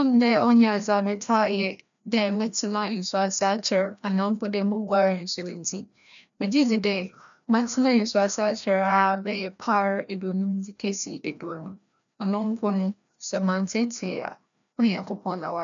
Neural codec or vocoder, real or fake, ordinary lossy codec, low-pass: codec, 16 kHz, 1 kbps, FreqCodec, larger model; fake; none; 7.2 kHz